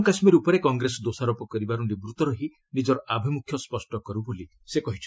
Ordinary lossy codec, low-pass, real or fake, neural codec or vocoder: none; none; real; none